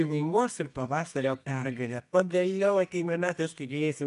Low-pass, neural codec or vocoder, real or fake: 10.8 kHz; codec, 24 kHz, 0.9 kbps, WavTokenizer, medium music audio release; fake